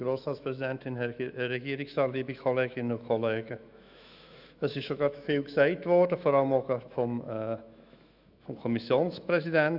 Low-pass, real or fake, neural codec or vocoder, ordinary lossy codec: 5.4 kHz; fake; autoencoder, 48 kHz, 128 numbers a frame, DAC-VAE, trained on Japanese speech; none